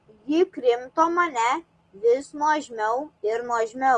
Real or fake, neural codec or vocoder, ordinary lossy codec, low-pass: real; none; Opus, 24 kbps; 10.8 kHz